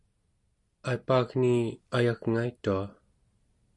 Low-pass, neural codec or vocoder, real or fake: 10.8 kHz; none; real